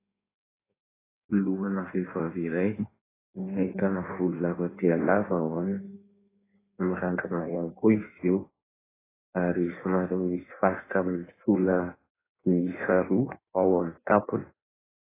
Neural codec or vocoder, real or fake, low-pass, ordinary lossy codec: codec, 16 kHz in and 24 kHz out, 1.1 kbps, FireRedTTS-2 codec; fake; 3.6 kHz; AAC, 16 kbps